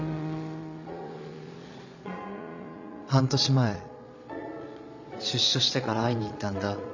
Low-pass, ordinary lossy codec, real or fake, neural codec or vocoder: 7.2 kHz; none; fake; vocoder, 22.05 kHz, 80 mel bands, Vocos